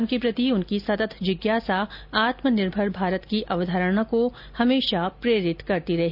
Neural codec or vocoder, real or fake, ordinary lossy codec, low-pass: none; real; none; 5.4 kHz